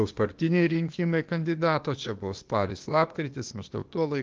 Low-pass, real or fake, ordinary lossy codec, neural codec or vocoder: 7.2 kHz; fake; Opus, 24 kbps; codec, 16 kHz, 0.8 kbps, ZipCodec